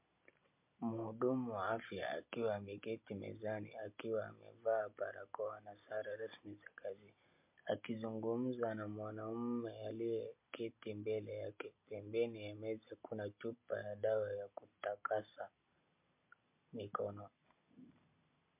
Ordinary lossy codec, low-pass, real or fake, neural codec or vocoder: MP3, 32 kbps; 3.6 kHz; real; none